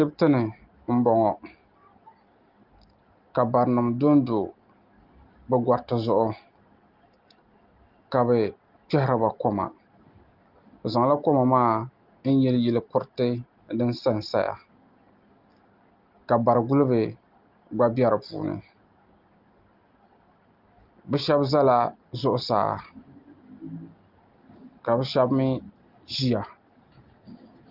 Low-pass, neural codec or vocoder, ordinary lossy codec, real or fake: 5.4 kHz; none; Opus, 32 kbps; real